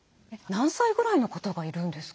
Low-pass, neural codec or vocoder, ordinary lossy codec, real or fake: none; none; none; real